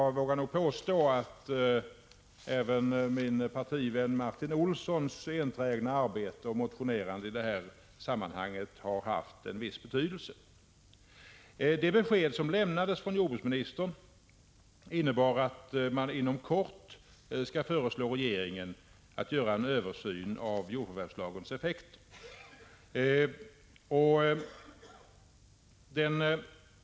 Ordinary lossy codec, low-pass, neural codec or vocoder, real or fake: none; none; none; real